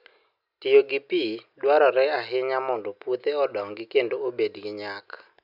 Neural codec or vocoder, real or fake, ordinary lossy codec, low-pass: none; real; none; 5.4 kHz